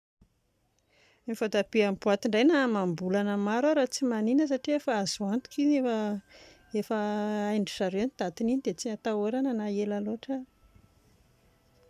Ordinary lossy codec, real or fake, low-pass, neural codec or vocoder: none; real; 14.4 kHz; none